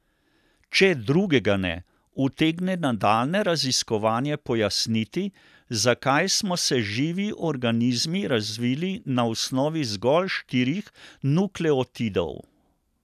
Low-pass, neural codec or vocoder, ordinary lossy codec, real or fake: 14.4 kHz; none; none; real